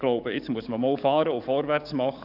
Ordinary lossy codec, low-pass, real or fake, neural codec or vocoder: none; 5.4 kHz; fake; codec, 44.1 kHz, 7.8 kbps, DAC